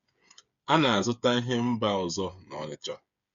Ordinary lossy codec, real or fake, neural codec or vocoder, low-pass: Opus, 64 kbps; fake; codec, 16 kHz, 8 kbps, FreqCodec, smaller model; 7.2 kHz